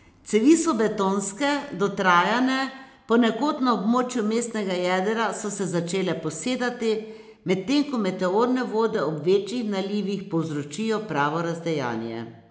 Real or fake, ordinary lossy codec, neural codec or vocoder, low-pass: real; none; none; none